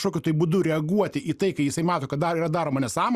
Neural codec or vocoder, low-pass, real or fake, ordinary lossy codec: none; 14.4 kHz; real; Opus, 64 kbps